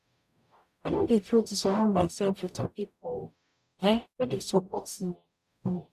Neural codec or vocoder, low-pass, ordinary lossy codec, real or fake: codec, 44.1 kHz, 0.9 kbps, DAC; 14.4 kHz; none; fake